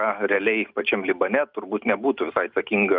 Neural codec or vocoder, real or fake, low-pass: none; real; 5.4 kHz